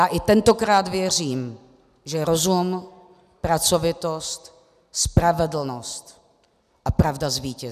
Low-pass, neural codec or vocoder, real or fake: 14.4 kHz; none; real